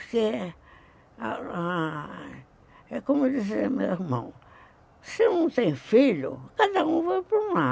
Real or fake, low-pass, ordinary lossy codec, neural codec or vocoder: real; none; none; none